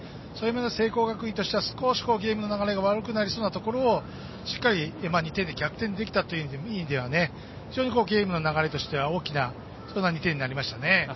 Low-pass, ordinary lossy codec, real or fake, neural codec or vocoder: 7.2 kHz; MP3, 24 kbps; real; none